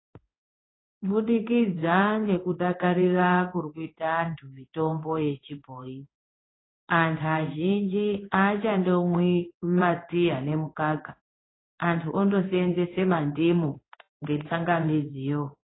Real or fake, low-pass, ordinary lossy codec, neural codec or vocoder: fake; 7.2 kHz; AAC, 16 kbps; codec, 16 kHz in and 24 kHz out, 1 kbps, XY-Tokenizer